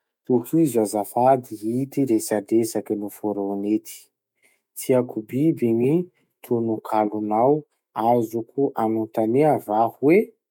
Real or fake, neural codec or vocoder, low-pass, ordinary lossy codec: fake; autoencoder, 48 kHz, 128 numbers a frame, DAC-VAE, trained on Japanese speech; 19.8 kHz; MP3, 96 kbps